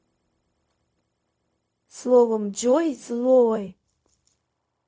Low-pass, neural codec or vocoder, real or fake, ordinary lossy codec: none; codec, 16 kHz, 0.4 kbps, LongCat-Audio-Codec; fake; none